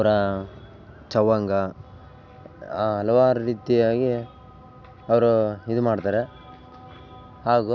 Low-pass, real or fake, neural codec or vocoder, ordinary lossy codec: 7.2 kHz; real; none; none